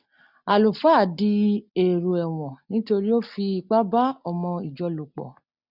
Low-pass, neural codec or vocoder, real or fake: 5.4 kHz; none; real